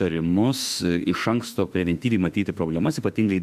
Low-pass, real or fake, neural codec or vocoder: 14.4 kHz; fake; autoencoder, 48 kHz, 32 numbers a frame, DAC-VAE, trained on Japanese speech